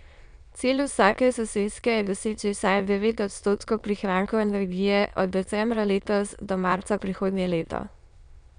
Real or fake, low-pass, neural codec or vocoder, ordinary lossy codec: fake; 9.9 kHz; autoencoder, 22.05 kHz, a latent of 192 numbers a frame, VITS, trained on many speakers; none